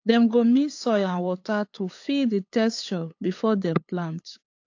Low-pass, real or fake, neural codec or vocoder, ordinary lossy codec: 7.2 kHz; fake; codec, 16 kHz, 8 kbps, FunCodec, trained on LibriTTS, 25 frames a second; AAC, 48 kbps